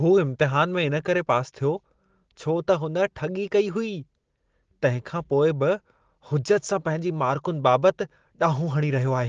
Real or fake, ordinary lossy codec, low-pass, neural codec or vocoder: real; Opus, 16 kbps; 7.2 kHz; none